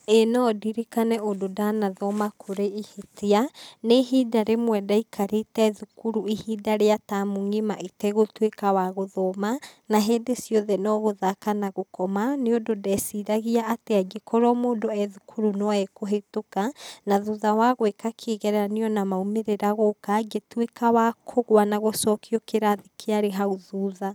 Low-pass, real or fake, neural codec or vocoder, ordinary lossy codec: none; real; none; none